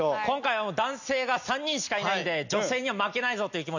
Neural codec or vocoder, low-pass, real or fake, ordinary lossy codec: none; 7.2 kHz; real; none